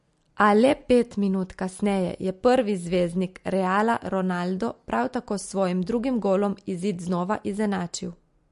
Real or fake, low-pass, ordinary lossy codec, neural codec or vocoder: real; 10.8 kHz; MP3, 48 kbps; none